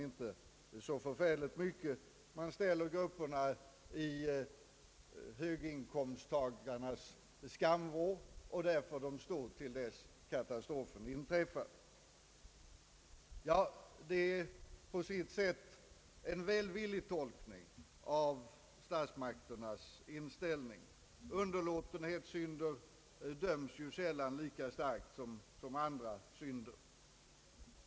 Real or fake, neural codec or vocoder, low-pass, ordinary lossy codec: real; none; none; none